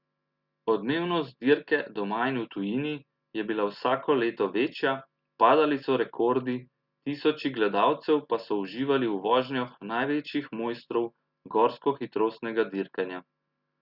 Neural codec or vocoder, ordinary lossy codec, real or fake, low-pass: none; Opus, 64 kbps; real; 5.4 kHz